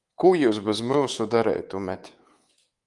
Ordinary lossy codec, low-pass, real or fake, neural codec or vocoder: Opus, 24 kbps; 10.8 kHz; fake; codec, 24 kHz, 3.1 kbps, DualCodec